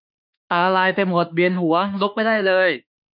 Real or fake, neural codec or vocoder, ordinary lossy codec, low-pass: fake; autoencoder, 48 kHz, 32 numbers a frame, DAC-VAE, trained on Japanese speech; none; 5.4 kHz